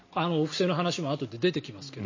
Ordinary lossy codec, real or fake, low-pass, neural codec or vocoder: MP3, 32 kbps; real; 7.2 kHz; none